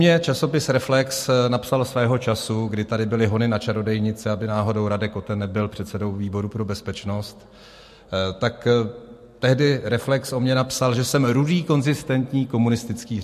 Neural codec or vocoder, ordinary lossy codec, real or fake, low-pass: none; MP3, 64 kbps; real; 14.4 kHz